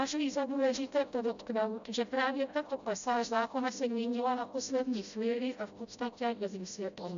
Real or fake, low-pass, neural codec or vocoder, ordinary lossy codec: fake; 7.2 kHz; codec, 16 kHz, 0.5 kbps, FreqCodec, smaller model; AAC, 96 kbps